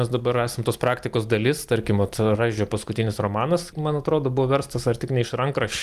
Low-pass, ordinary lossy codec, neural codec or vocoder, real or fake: 14.4 kHz; Opus, 32 kbps; none; real